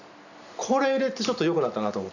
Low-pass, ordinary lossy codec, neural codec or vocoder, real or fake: 7.2 kHz; none; none; real